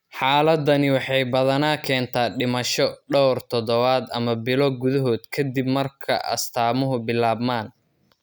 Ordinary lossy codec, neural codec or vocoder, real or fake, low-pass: none; none; real; none